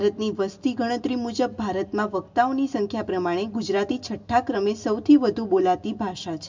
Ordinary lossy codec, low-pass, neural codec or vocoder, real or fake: MP3, 64 kbps; 7.2 kHz; none; real